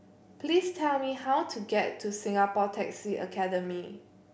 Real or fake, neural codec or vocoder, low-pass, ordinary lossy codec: real; none; none; none